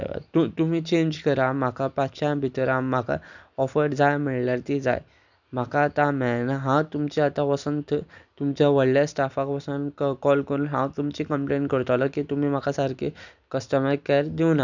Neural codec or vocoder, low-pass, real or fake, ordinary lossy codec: none; 7.2 kHz; real; none